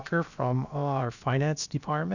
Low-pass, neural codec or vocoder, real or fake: 7.2 kHz; codec, 16 kHz, about 1 kbps, DyCAST, with the encoder's durations; fake